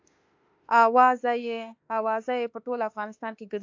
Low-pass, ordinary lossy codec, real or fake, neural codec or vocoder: 7.2 kHz; AAC, 48 kbps; fake; autoencoder, 48 kHz, 32 numbers a frame, DAC-VAE, trained on Japanese speech